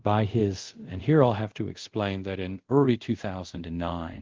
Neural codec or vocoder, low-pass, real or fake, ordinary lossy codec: codec, 24 kHz, 0.5 kbps, DualCodec; 7.2 kHz; fake; Opus, 16 kbps